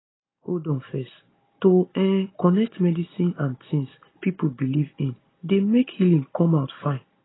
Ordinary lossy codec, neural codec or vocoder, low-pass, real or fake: AAC, 16 kbps; none; 7.2 kHz; real